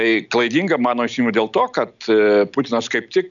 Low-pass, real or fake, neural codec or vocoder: 7.2 kHz; real; none